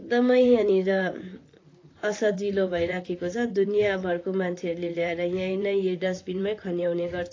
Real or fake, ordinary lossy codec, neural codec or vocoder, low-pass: fake; AAC, 32 kbps; vocoder, 44.1 kHz, 128 mel bands, Pupu-Vocoder; 7.2 kHz